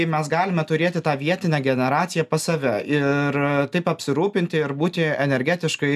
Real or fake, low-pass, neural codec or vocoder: real; 14.4 kHz; none